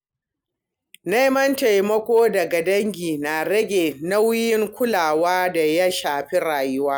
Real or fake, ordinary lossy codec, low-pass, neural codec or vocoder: real; none; none; none